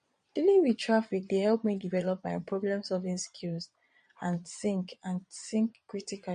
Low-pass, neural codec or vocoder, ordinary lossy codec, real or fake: 9.9 kHz; vocoder, 22.05 kHz, 80 mel bands, Vocos; MP3, 48 kbps; fake